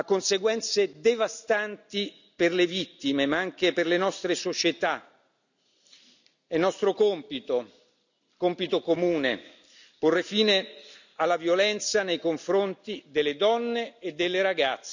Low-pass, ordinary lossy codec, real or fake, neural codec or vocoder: 7.2 kHz; none; real; none